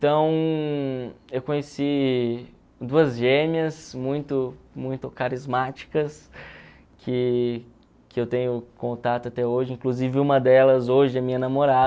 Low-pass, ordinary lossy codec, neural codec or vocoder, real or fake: none; none; none; real